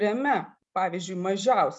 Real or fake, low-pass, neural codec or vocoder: real; 10.8 kHz; none